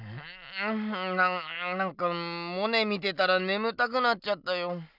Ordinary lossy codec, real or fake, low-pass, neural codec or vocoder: none; real; 5.4 kHz; none